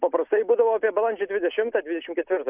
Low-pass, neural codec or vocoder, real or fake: 3.6 kHz; none; real